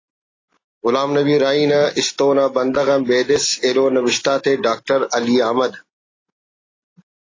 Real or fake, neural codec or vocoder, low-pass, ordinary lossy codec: real; none; 7.2 kHz; AAC, 32 kbps